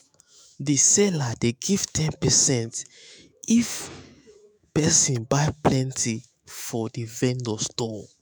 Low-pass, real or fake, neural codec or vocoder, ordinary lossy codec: none; fake; autoencoder, 48 kHz, 128 numbers a frame, DAC-VAE, trained on Japanese speech; none